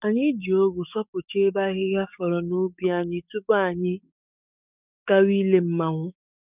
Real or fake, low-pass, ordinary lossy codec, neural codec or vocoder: fake; 3.6 kHz; none; codec, 44.1 kHz, 7.8 kbps, DAC